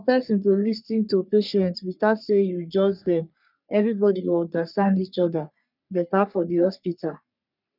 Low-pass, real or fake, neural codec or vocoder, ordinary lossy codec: 5.4 kHz; fake; codec, 44.1 kHz, 3.4 kbps, Pupu-Codec; none